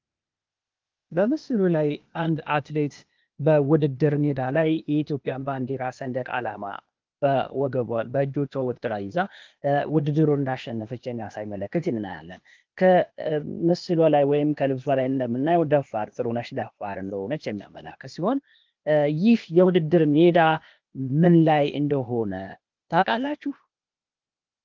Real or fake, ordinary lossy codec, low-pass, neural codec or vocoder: fake; Opus, 24 kbps; 7.2 kHz; codec, 16 kHz, 0.8 kbps, ZipCodec